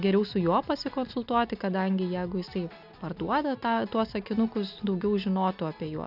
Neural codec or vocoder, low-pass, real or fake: none; 5.4 kHz; real